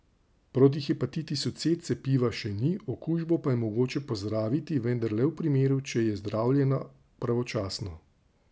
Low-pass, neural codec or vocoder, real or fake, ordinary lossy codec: none; none; real; none